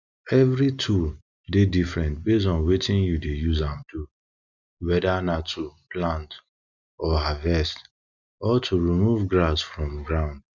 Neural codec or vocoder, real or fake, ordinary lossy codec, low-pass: none; real; none; 7.2 kHz